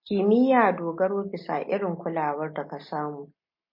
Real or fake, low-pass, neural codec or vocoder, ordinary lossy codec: real; 5.4 kHz; none; MP3, 24 kbps